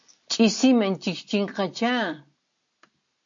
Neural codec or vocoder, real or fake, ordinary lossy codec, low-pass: none; real; MP3, 48 kbps; 7.2 kHz